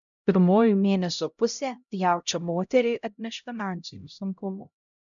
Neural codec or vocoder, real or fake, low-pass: codec, 16 kHz, 0.5 kbps, X-Codec, HuBERT features, trained on LibriSpeech; fake; 7.2 kHz